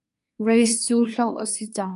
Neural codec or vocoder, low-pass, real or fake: codec, 24 kHz, 1 kbps, SNAC; 10.8 kHz; fake